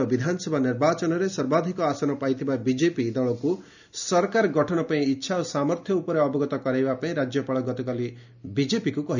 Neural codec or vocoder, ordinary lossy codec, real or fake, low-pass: none; none; real; 7.2 kHz